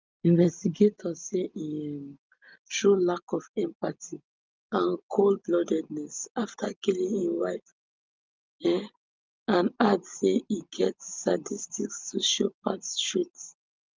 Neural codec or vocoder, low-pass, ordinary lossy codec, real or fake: none; 7.2 kHz; Opus, 24 kbps; real